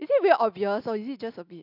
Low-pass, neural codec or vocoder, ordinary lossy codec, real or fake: 5.4 kHz; none; none; real